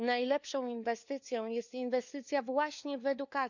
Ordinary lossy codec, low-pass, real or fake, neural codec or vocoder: none; 7.2 kHz; fake; codec, 16 kHz, 4 kbps, FunCodec, trained on LibriTTS, 50 frames a second